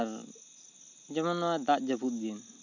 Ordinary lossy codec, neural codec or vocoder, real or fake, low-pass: none; none; real; 7.2 kHz